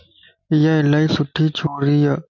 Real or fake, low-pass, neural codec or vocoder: real; 7.2 kHz; none